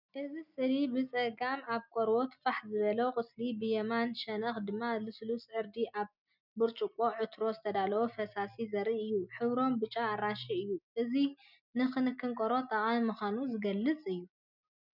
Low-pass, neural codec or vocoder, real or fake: 5.4 kHz; none; real